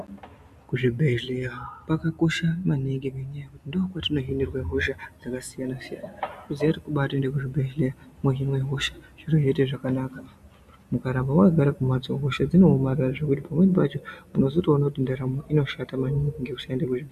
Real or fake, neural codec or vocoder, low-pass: real; none; 14.4 kHz